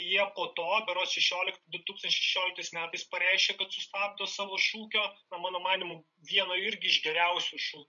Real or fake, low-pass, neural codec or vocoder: fake; 7.2 kHz; codec, 16 kHz, 16 kbps, FreqCodec, larger model